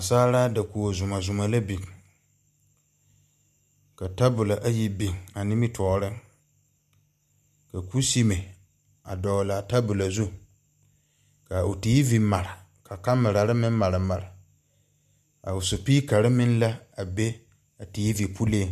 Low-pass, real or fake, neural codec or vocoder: 14.4 kHz; real; none